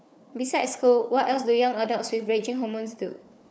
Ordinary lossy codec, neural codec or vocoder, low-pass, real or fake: none; codec, 16 kHz, 4 kbps, FunCodec, trained on Chinese and English, 50 frames a second; none; fake